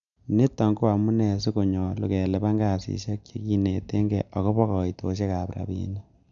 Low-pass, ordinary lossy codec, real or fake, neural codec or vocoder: 7.2 kHz; none; real; none